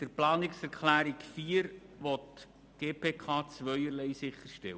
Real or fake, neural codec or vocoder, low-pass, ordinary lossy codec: real; none; none; none